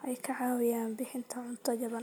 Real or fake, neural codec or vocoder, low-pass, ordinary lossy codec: real; none; none; none